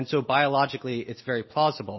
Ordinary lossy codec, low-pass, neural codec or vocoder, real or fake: MP3, 24 kbps; 7.2 kHz; none; real